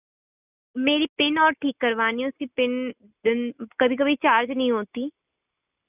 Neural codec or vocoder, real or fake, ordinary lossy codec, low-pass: none; real; none; 3.6 kHz